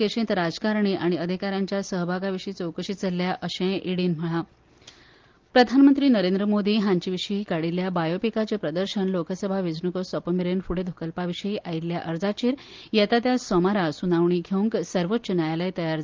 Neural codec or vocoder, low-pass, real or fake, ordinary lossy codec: none; 7.2 kHz; real; Opus, 32 kbps